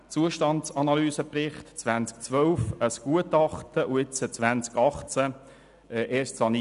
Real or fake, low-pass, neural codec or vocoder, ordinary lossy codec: real; 10.8 kHz; none; none